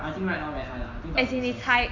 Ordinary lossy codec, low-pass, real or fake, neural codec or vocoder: AAC, 48 kbps; 7.2 kHz; real; none